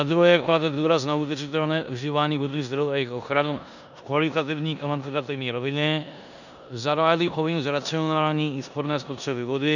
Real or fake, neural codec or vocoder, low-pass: fake; codec, 16 kHz in and 24 kHz out, 0.9 kbps, LongCat-Audio-Codec, four codebook decoder; 7.2 kHz